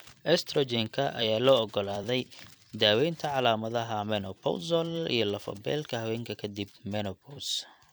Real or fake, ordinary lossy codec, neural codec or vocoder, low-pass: fake; none; vocoder, 44.1 kHz, 128 mel bands every 256 samples, BigVGAN v2; none